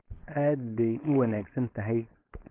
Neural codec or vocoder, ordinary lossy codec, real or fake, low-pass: codec, 16 kHz, 4.8 kbps, FACodec; Opus, 16 kbps; fake; 3.6 kHz